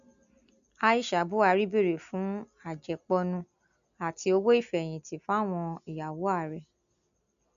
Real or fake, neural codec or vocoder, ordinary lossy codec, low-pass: real; none; none; 7.2 kHz